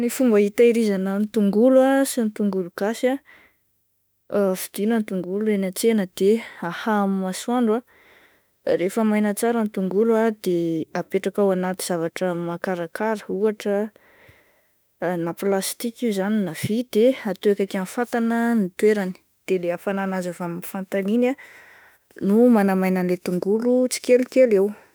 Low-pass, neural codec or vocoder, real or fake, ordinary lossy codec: none; autoencoder, 48 kHz, 32 numbers a frame, DAC-VAE, trained on Japanese speech; fake; none